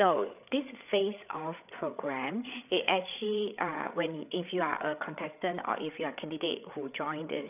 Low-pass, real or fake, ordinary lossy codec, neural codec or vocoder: 3.6 kHz; fake; none; codec, 16 kHz, 8 kbps, FreqCodec, larger model